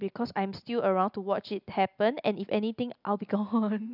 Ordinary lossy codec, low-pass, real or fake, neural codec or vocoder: none; 5.4 kHz; real; none